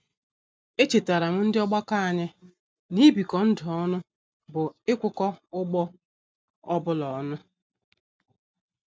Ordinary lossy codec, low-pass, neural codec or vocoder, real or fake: none; none; none; real